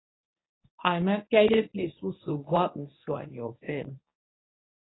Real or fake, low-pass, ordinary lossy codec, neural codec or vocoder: fake; 7.2 kHz; AAC, 16 kbps; codec, 24 kHz, 0.9 kbps, WavTokenizer, medium speech release version 1